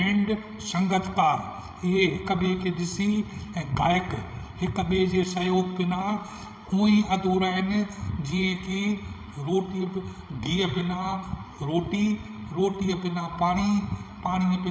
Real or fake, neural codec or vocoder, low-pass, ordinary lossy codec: fake; codec, 16 kHz, 16 kbps, FreqCodec, larger model; none; none